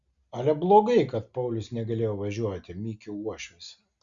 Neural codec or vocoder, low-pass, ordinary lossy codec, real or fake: none; 7.2 kHz; Opus, 64 kbps; real